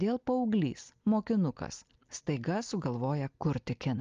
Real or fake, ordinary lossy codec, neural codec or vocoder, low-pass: real; Opus, 32 kbps; none; 7.2 kHz